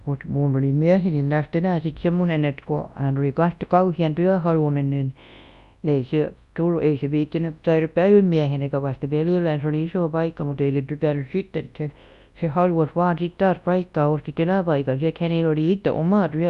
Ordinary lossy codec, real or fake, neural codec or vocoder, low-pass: Opus, 64 kbps; fake; codec, 24 kHz, 0.9 kbps, WavTokenizer, large speech release; 10.8 kHz